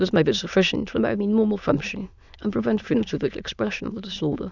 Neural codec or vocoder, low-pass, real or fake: autoencoder, 22.05 kHz, a latent of 192 numbers a frame, VITS, trained on many speakers; 7.2 kHz; fake